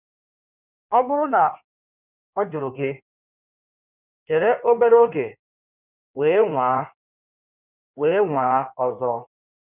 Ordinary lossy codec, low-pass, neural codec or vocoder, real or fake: none; 3.6 kHz; codec, 16 kHz in and 24 kHz out, 1.1 kbps, FireRedTTS-2 codec; fake